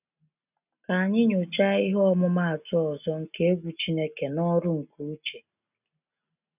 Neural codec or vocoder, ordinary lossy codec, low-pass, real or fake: none; none; 3.6 kHz; real